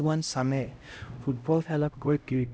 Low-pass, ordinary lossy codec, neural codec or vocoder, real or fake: none; none; codec, 16 kHz, 0.5 kbps, X-Codec, HuBERT features, trained on LibriSpeech; fake